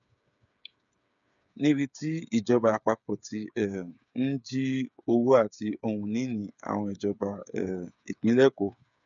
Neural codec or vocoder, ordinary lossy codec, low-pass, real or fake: codec, 16 kHz, 8 kbps, FreqCodec, smaller model; none; 7.2 kHz; fake